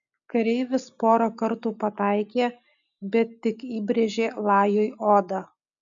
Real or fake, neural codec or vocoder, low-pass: real; none; 7.2 kHz